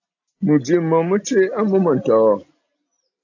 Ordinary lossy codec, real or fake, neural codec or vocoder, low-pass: AAC, 48 kbps; real; none; 7.2 kHz